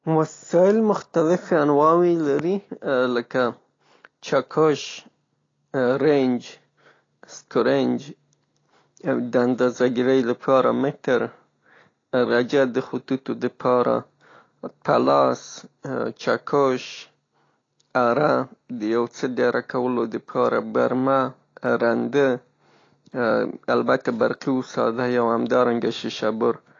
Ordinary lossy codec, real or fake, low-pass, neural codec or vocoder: AAC, 32 kbps; real; 7.2 kHz; none